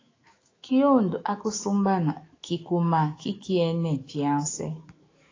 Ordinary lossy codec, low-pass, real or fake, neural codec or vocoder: AAC, 32 kbps; 7.2 kHz; fake; autoencoder, 48 kHz, 128 numbers a frame, DAC-VAE, trained on Japanese speech